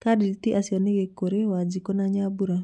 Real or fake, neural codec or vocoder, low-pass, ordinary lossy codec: real; none; 10.8 kHz; none